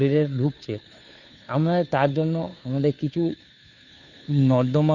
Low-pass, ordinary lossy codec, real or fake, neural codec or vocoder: 7.2 kHz; none; fake; codec, 16 kHz, 2 kbps, FunCodec, trained on Chinese and English, 25 frames a second